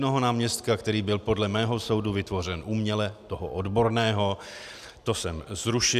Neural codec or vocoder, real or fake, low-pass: none; real; 14.4 kHz